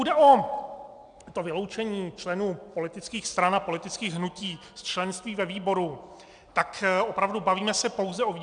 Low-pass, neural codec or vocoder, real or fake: 9.9 kHz; none; real